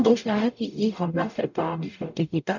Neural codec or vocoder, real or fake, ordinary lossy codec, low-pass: codec, 44.1 kHz, 0.9 kbps, DAC; fake; none; 7.2 kHz